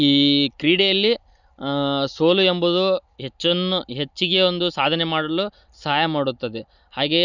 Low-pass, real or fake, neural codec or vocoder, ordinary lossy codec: 7.2 kHz; real; none; none